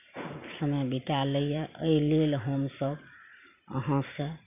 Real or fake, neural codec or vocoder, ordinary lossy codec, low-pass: fake; vocoder, 44.1 kHz, 128 mel bands every 512 samples, BigVGAN v2; none; 3.6 kHz